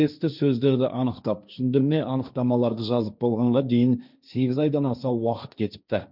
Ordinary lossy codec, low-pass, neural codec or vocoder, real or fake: none; 5.4 kHz; codec, 16 kHz, 1.1 kbps, Voila-Tokenizer; fake